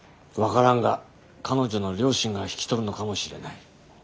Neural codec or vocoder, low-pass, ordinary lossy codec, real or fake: none; none; none; real